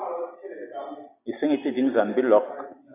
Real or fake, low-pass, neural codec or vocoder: real; 3.6 kHz; none